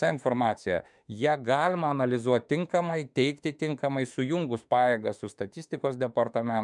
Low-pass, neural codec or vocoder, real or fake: 10.8 kHz; autoencoder, 48 kHz, 32 numbers a frame, DAC-VAE, trained on Japanese speech; fake